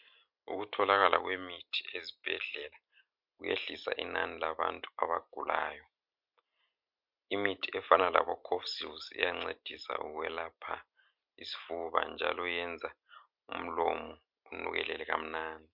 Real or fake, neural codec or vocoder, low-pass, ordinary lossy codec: real; none; 5.4 kHz; MP3, 48 kbps